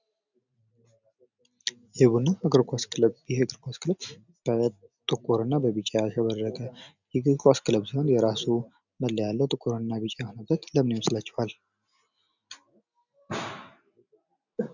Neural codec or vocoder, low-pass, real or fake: none; 7.2 kHz; real